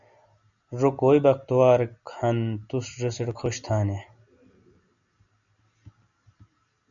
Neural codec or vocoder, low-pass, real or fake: none; 7.2 kHz; real